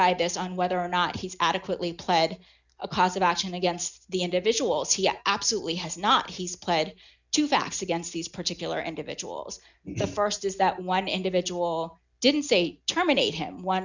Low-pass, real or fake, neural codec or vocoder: 7.2 kHz; real; none